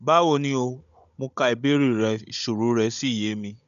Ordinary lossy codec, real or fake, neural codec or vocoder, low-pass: none; fake; codec, 16 kHz, 16 kbps, FunCodec, trained on Chinese and English, 50 frames a second; 7.2 kHz